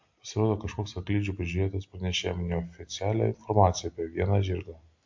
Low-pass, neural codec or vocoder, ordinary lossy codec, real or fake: 7.2 kHz; none; MP3, 48 kbps; real